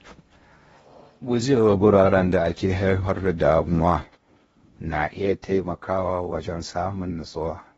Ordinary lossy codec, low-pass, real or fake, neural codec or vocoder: AAC, 24 kbps; 10.8 kHz; fake; codec, 16 kHz in and 24 kHz out, 0.6 kbps, FocalCodec, streaming, 2048 codes